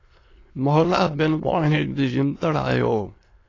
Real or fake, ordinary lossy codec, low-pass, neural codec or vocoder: fake; AAC, 32 kbps; 7.2 kHz; autoencoder, 22.05 kHz, a latent of 192 numbers a frame, VITS, trained on many speakers